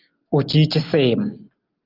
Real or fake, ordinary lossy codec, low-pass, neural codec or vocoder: real; Opus, 32 kbps; 5.4 kHz; none